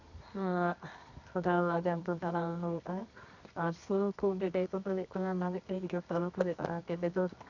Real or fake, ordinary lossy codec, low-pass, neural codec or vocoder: fake; none; 7.2 kHz; codec, 24 kHz, 0.9 kbps, WavTokenizer, medium music audio release